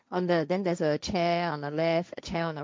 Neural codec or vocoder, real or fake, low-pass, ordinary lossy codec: codec, 16 kHz, 1.1 kbps, Voila-Tokenizer; fake; none; none